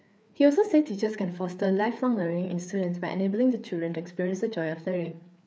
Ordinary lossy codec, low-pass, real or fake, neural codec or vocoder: none; none; fake; codec, 16 kHz, 4 kbps, FreqCodec, larger model